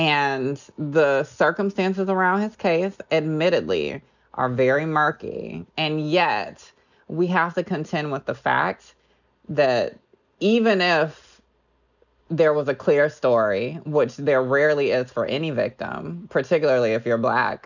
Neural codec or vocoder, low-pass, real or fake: none; 7.2 kHz; real